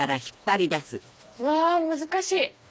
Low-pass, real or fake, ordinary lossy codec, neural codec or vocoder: none; fake; none; codec, 16 kHz, 2 kbps, FreqCodec, smaller model